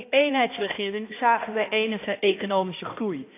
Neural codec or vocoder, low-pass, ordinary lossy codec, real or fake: codec, 16 kHz, 1 kbps, X-Codec, HuBERT features, trained on balanced general audio; 3.6 kHz; AAC, 24 kbps; fake